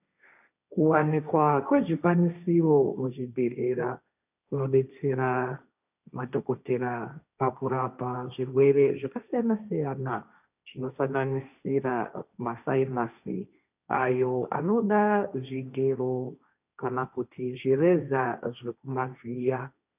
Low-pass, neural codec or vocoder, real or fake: 3.6 kHz; codec, 16 kHz, 1.1 kbps, Voila-Tokenizer; fake